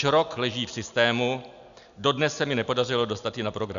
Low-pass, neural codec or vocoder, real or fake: 7.2 kHz; none; real